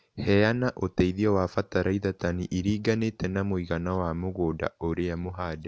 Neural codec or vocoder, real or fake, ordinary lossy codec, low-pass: none; real; none; none